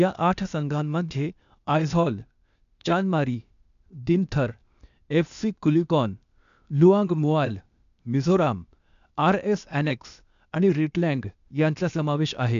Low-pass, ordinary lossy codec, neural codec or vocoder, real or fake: 7.2 kHz; none; codec, 16 kHz, 0.8 kbps, ZipCodec; fake